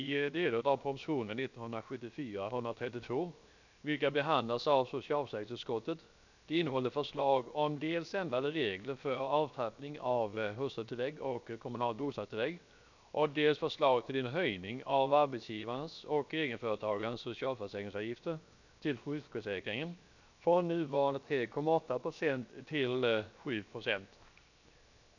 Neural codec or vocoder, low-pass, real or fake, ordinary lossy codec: codec, 16 kHz, 0.7 kbps, FocalCodec; 7.2 kHz; fake; none